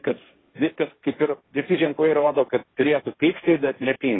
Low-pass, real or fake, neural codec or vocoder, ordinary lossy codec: 7.2 kHz; fake; codec, 16 kHz, 1.1 kbps, Voila-Tokenizer; AAC, 16 kbps